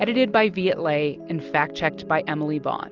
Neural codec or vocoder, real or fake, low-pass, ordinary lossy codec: none; real; 7.2 kHz; Opus, 24 kbps